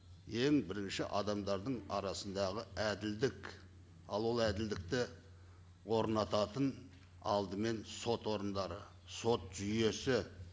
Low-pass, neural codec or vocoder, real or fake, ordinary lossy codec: none; none; real; none